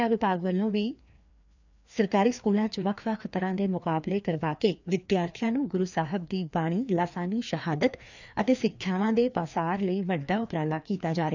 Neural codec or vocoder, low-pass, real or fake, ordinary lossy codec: codec, 16 kHz, 2 kbps, FreqCodec, larger model; 7.2 kHz; fake; none